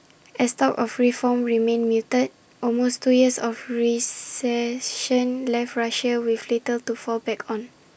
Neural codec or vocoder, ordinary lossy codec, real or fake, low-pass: none; none; real; none